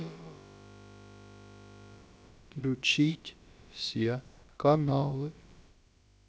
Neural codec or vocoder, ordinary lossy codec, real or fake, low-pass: codec, 16 kHz, about 1 kbps, DyCAST, with the encoder's durations; none; fake; none